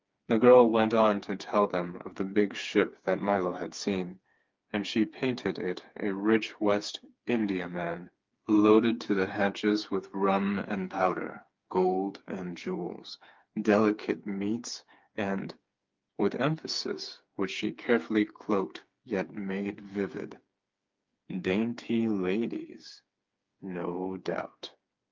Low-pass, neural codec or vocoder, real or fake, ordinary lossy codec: 7.2 kHz; codec, 16 kHz, 4 kbps, FreqCodec, smaller model; fake; Opus, 24 kbps